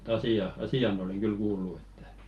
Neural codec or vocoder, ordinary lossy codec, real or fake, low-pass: none; Opus, 24 kbps; real; 19.8 kHz